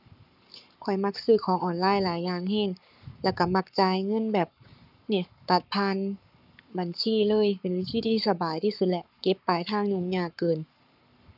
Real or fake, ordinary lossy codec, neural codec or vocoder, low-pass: fake; none; codec, 44.1 kHz, 7.8 kbps, Pupu-Codec; 5.4 kHz